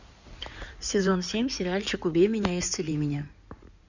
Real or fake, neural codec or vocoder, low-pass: fake; codec, 16 kHz in and 24 kHz out, 2.2 kbps, FireRedTTS-2 codec; 7.2 kHz